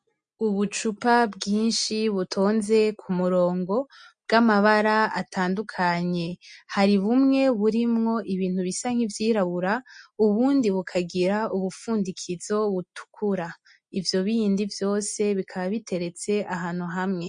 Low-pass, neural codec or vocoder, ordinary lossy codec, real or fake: 9.9 kHz; none; MP3, 48 kbps; real